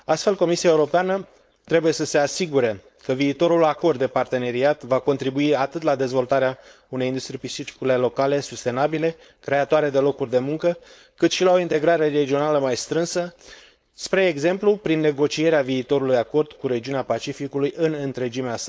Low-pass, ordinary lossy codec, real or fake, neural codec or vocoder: none; none; fake; codec, 16 kHz, 4.8 kbps, FACodec